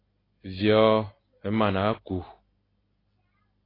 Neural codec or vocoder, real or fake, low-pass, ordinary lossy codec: none; real; 5.4 kHz; AAC, 24 kbps